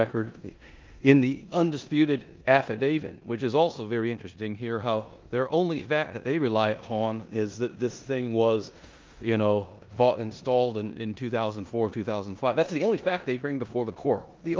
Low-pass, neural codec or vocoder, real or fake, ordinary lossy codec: 7.2 kHz; codec, 16 kHz in and 24 kHz out, 0.9 kbps, LongCat-Audio-Codec, four codebook decoder; fake; Opus, 32 kbps